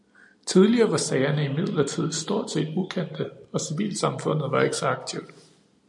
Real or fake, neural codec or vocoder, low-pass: real; none; 10.8 kHz